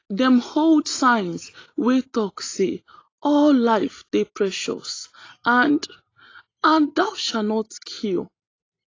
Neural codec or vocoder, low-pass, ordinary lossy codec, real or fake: none; 7.2 kHz; AAC, 32 kbps; real